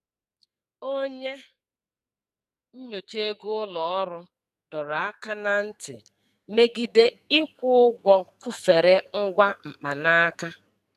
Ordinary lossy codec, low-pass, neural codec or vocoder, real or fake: none; 14.4 kHz; codec, 44.1 kHz, 2.6 kbps, SNAC; fake